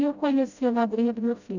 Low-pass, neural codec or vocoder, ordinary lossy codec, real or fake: 7.2 kHz; codec, 16 kHz, 0.5 kbps, FreqCodec, smaller model; none; fake